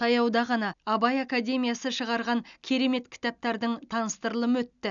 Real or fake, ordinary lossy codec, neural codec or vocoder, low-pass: real; none; none; 7.2 kHz